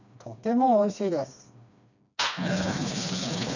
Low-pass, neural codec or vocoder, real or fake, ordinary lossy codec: 7.2 kHz; codec, 16 kHz, 2 kbps, FreqCodec, smaller model; fake; none